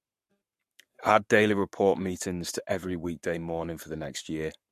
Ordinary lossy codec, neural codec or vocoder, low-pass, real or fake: MP3, 64 kbps; codec, 44.1 kHz, 7.8 kbps, Pupu-Codec; 14.4 kHz; fake